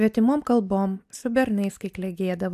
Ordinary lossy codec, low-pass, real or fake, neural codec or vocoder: AAC, 96 kbps; 14.4 kHz; real; none